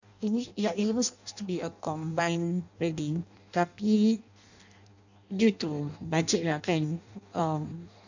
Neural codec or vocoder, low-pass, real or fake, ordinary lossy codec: codec, 16 kHz in and 24 kHz out, 0.6 kbps, FireRedTTS-2 codec; 7.2 kHz; fake; none